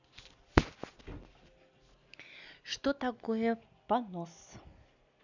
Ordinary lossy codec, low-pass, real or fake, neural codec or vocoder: none; 7.2 kHz; real; none